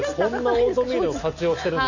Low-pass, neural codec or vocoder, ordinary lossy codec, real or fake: 7.2 kHz; none; none; real